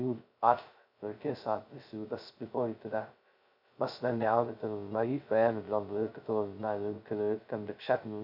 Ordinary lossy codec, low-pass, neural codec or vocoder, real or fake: none; 5.4 kHz; codec, 16 kHz, 0.2 kbps, FocalCodec; fake